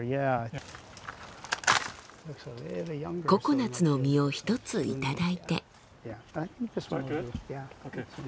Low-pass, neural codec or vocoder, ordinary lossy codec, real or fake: none; none; none; real